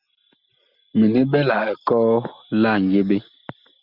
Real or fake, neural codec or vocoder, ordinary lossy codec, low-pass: real; none; Opus, 64 kbps; 5.4 kHz